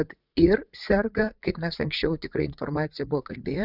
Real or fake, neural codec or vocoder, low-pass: fake; vocoder, 44.1 kHz, 80 mel bands, Vocos; 5.4 kHz